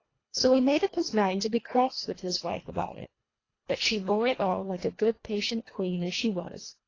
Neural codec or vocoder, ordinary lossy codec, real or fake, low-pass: codec, 24 kHz, 1.5 kbps, HILCodec; AAC, 32 kbps; fake; 7.2 kHz